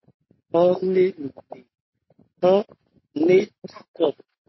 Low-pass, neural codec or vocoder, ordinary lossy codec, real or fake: 7.2 kHz; none; MP3, 24 kbps; real